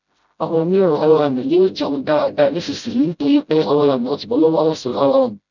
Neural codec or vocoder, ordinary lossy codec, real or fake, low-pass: codec, 16 kHz, 0.5 kbps, FreqCodec, smaller model; none; fake; 7.2 kHz